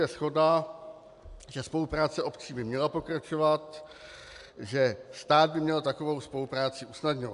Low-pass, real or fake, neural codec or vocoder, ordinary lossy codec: 10.8 kHz; real; none; AAC, 96 kbps